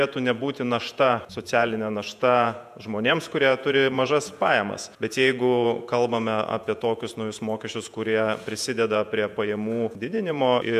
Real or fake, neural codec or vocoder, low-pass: fake; vocoder, 48 kHz, 128 mel bands, Vocos; 14.4 kHz